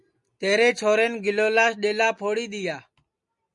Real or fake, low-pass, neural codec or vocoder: real; 10.8 kHz; none